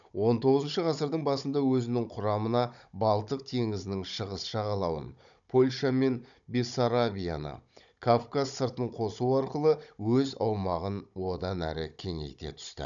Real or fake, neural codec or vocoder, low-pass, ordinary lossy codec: fake; codec, 16 kHz, 16 kbps, FunCodec, trained on Chinese and English, 50 frames a second; 7.2 kHz; none